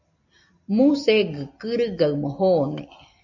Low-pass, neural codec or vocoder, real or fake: 7.2 kHz; none; real